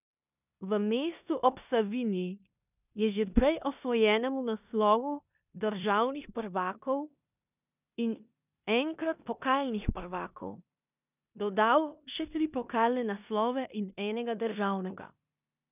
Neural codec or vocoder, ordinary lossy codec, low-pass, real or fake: codec, 16 kHz in and 24 kHz out, 0.9 kbps, LongCat-Audio-Codec, four codebook decoder; none; 3.6 kHz; fake